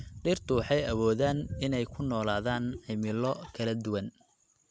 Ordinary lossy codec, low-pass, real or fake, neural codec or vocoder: none; none; real; none